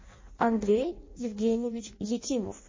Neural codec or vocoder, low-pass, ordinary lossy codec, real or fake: codec, 16 kHz in and 24 kHz out, 0.6 kbps, FireRedTTS-2 codec; 7.2 kHz; MP3, 32 kbps; fake